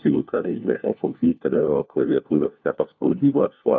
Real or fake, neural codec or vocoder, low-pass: fake; codec, 16 kHz, 1 kbps, FunCodec, trained on LibriTTS, 50 frames a second; 7.2 kHz